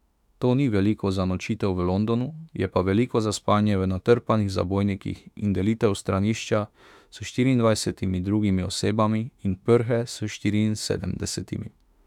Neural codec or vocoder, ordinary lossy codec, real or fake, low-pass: autoencoder, 48 kHz, 32 numbers a frame, DAC-VAE, trained on Japanese speech; none; fake; 19.8 kHz